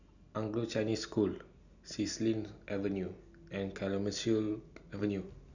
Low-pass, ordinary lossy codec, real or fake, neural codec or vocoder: 7.2 kHz; none; real; none